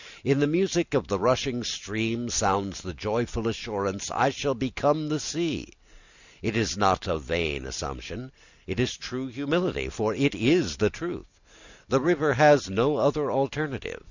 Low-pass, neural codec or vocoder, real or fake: 7.2 kHz; none; real